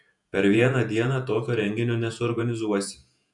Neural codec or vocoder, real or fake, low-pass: vocoder, 48 kHz, 128 mel bands, Vocos; fake; 10.8 kHz